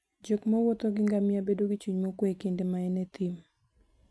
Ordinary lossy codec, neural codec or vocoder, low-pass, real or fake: none; none; none; real